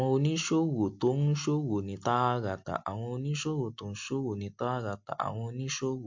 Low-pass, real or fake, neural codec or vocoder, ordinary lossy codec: 7.2 kHz; real; none; MP3, 48 kbps